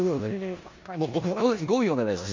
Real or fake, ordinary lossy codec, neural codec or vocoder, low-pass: fake; MP3, 48 kbps; codec, 16 kHz in and 24 kHz out, 0.9 kbps, LongCat-Audio-Codec, four codebook decoder; 7.2 kHz